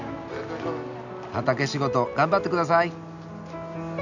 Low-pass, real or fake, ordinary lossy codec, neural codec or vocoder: 7.2 kHz; real; none; none